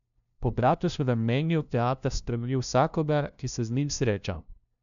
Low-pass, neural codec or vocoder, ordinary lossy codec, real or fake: 7.2 kHz; codec, 16 kHz, 0.5 kbps, FunCodec, trained on LibriTTS, 25 frames a second; none; fake